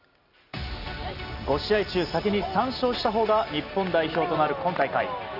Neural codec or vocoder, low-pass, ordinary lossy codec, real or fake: none; 5.4 kHz; none; real